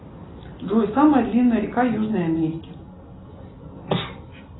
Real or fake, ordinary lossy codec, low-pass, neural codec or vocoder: real; AAC, 16 kbps; 7.2 kHz; none